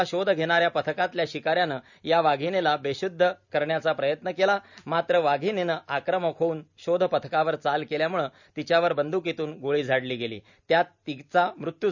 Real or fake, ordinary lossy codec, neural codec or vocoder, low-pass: real; none; none; 7.2 kHz